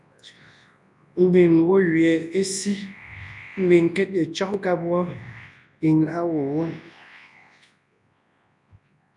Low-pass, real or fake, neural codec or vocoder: 10.8 kHz; fake; codec, 24 kHz, 0.9 kbps, WavTokenizer, large speech release